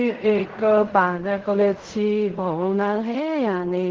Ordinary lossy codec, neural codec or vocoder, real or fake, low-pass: Opus, 16 kbps; codec, 16 kHz in and 24 kHz out, 0.4 kbps, LongCat-Audio-Codec, fine tuned four codebook decoder; fake; 7.2 kHz